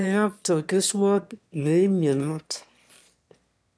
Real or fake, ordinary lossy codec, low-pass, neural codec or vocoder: fake; none; none; autoencoder, 22.05 kHz, a latent of 192 numbers a frame, VITS, trained on one speaker